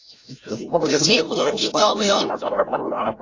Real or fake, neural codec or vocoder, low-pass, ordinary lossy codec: fake; codec, 16 kHz, 0.5 kbps, FreqCodec, larger model; 7.2 kHz; AAC, 32 kbps